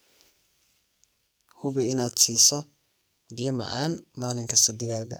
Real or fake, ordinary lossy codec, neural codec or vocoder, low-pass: fake; none; codec, 44.1 kHz, 2.6 kbps, SNAC; none